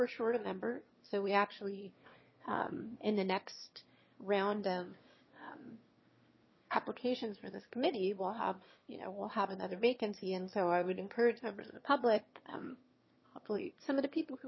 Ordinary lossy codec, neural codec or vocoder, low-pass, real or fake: MP3, 24 kbps; autoencoder, 22.05 kHz, a latent of 192 numbers a frame, VITS, trained on one speaker; 7.2 kHz; fake